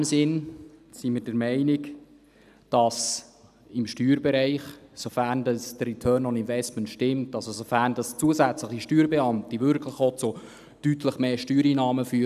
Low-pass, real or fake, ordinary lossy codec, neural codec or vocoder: 14.4 kHz; real; none; none